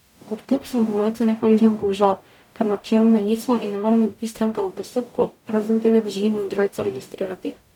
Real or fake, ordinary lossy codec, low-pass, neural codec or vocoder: fake; none; 19.8 kHz; codec, 44.1 kHz, 0.9 kbps, DAC